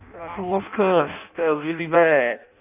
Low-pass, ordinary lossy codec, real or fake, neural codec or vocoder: 3.6 kHz; none; fake; codec, 16 kHz in and 24 kHz out, 0.6 kbps, FireRedTTS-2 codec